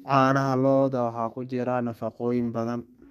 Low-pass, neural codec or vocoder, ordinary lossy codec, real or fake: 14.4 kHz; codec, 32 kHz, 1.9 kbps, SNAC; MP3, 96 kbps; fake